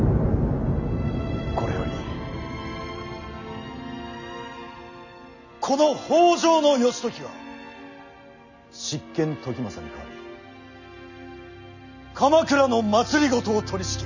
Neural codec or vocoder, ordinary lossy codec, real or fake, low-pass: none; none; real; 7.2 kHz